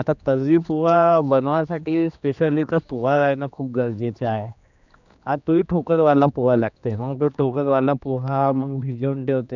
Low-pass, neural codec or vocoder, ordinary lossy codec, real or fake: 7.2 kHz; codec, 16 kHz, 2 kbps, X-Codec, HuBERT features, trained on general audio; none; fake